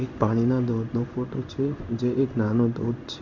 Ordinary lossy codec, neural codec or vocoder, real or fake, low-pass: none; codec, 16 kHz in and 24 kHz out, 1 kbps, XY-Tokenizer; fake; 7.2 kHz